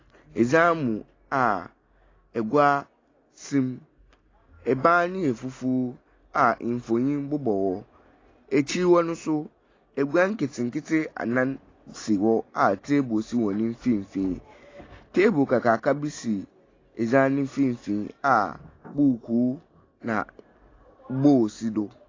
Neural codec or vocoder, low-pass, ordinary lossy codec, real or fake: none; 7.2 kHz; AAC, 32 kbps; real